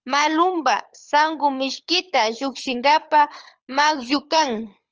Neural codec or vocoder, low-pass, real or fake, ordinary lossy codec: codec, 24 kHz, 6 kbps, HILCodec; 7.2 kHz; fake; Opus, 24 kbps